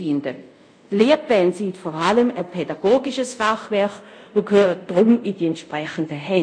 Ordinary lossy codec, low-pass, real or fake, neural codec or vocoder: none; 9.9 kHz; fake; codec, 24 kHz, 0.5 kbps, DualCodec